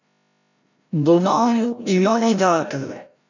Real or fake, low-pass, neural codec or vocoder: fake; 7.2 kHz; codec, 16 kHz, 0.5 kbps, FreqCodec, larger model